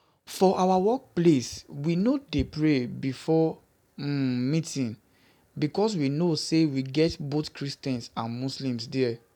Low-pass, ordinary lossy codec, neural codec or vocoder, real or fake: 19.8 kHz; none; none; real